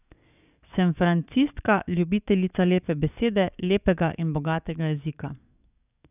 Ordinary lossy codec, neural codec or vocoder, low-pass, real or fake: none; codec, 44.1 kHz, 7.8 kbps, DAC; 3.6 kHz; fake